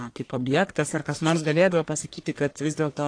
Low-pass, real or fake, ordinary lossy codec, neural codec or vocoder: 9.9 kHz; fake; AAC, 48 kbps; codec, 44.1 kHz, 1.7 kbps, Pupu-Codec